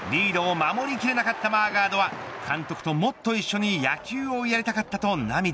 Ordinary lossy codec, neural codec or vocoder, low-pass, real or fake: none; none; none; real